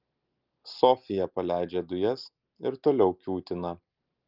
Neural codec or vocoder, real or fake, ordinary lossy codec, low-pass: none; real; Opus, 32 kbps; 5.4 kHz